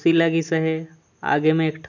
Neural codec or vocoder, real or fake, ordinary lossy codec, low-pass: none; real; none; 7.2 kHz